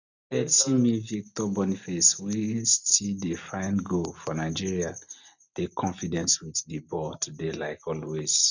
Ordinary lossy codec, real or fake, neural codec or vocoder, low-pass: none; real; none; 7.2 kHz